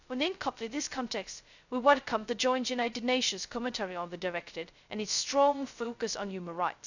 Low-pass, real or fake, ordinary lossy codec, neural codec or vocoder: 7.2 kHz; fake; none; codec, 16 kHz, 0.2 kbps, FocalCodec